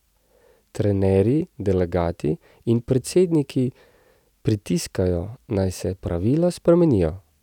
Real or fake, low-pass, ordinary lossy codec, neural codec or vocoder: real; 19.8 kHz; none; none